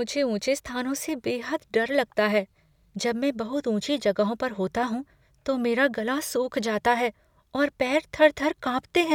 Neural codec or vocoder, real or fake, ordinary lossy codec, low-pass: none; real; none; 19.8 kHz